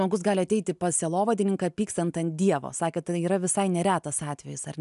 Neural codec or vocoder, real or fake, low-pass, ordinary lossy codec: none; real; 10.8 kHz; MP3, 96 kbps